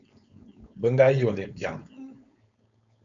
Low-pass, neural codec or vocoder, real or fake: 7.2 kHz; codec, 16 kHz, 4.8 kbps, FACodec; fake